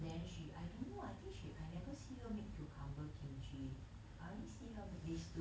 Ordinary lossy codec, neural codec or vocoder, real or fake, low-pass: none; none; real; none